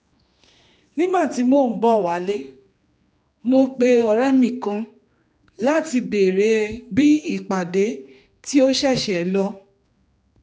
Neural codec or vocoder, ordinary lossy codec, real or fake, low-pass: codec, 16 kHz, 2 kbps, X-Codec, HuBERT features, trained on general audio; none; fake; none